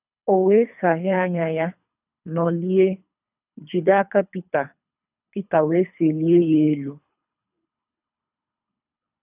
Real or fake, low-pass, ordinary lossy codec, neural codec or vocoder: fake; 3.6 kHz; none; codec, 24 kHz, 3 kbps, HILCodec